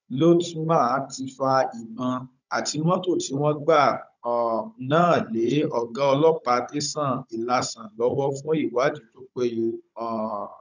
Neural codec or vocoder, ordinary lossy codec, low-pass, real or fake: codec, 16 kHz, 16 kbps, FunCodec, trained on Chinese and English, 50 frames a second; none; 7.2 kHz; fake